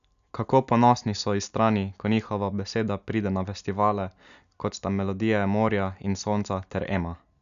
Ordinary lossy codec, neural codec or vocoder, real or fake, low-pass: none; none; real; 7.2 kHz